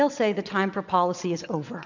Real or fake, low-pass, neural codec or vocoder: fake; 7.2 kHz; vocoder, 22.05 kHz, 80 mel bands, WaveNeXt